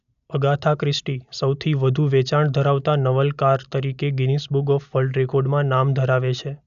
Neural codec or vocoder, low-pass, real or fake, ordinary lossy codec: none; 7.2 kHz; real; none